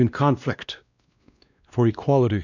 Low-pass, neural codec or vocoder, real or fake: 7.2 kHz; codec, 16 kHz, 1 kbps, X-Codec, WavLM features, trained on Multilingual LibriSpeech; fake